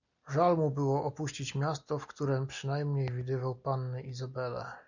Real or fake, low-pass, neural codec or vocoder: real; 7.2 kHz; none